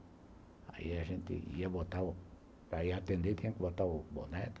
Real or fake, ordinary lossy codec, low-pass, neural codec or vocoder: real; none; none; none